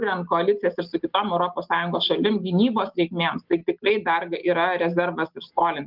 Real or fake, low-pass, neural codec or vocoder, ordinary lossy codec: real; 5.4 kHz; none; Opus, 24 kbps